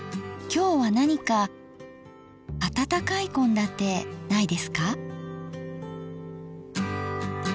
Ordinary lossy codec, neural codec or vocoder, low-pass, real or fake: none; none; none; real